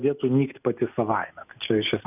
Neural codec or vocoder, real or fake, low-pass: none; real; 3.6 kHz